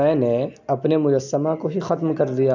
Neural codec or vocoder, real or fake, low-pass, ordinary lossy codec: none; real; 7.2 kHz; none